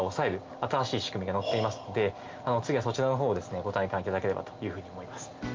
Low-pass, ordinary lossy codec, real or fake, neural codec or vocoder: 7.2 kHz; Opus, 24 kbps; real; none